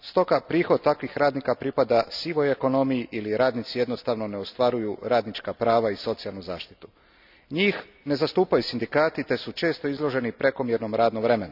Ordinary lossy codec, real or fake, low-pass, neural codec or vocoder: none; real; 5.4 kHz; none